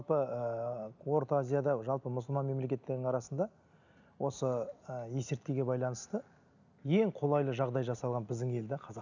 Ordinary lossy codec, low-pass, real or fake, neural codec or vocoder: none; 7.2 kHz; real; none